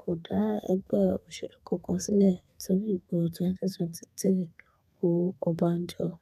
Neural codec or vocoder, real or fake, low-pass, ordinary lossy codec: codec, 32 kHz, 1.9 kbps, SNAC; fake; 14.4 kHz; none